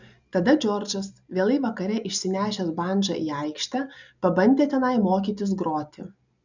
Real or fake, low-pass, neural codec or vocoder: real; 7.2 kHz; none